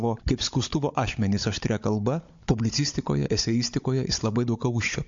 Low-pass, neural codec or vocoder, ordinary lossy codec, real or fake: 7.2 kHz; codec, 16 kHz, 16 kbps, FunCodec, trained on Chinese and English, 50 frames a second; MP3, 48 kbps; fake